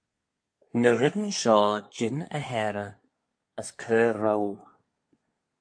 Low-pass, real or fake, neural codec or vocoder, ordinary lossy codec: 9.9 kHz; fake; codec, 24 kHz, 1 kbps, SNAC; MP3, 48 kbps